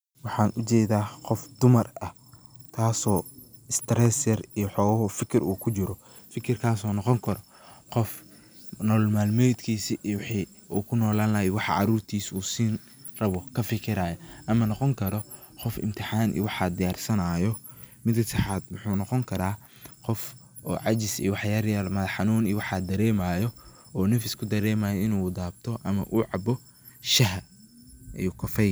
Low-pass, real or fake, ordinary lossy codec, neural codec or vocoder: none; real; none; none